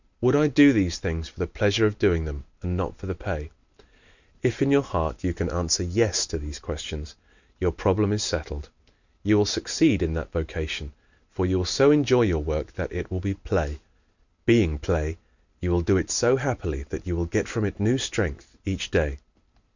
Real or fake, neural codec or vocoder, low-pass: real; none; 7.2 kHz